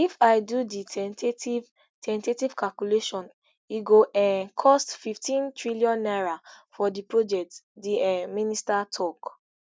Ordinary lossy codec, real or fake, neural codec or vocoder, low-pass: none; real; none; none